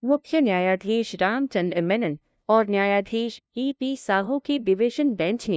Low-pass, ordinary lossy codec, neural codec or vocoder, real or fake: none; none; codec, 16 kHz, 0.5 kbps, FunCodec, trained on LibriTTS, 25 frames a second; fake